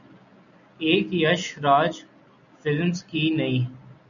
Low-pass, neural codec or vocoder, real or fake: 7.2 kHz; none; real